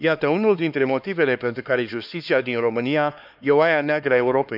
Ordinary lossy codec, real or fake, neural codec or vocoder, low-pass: none; fake; codec, 16 kHz, 2 kbps, X-Codec, HuBERT features, trained on LibriSpeech; 5.4 kHz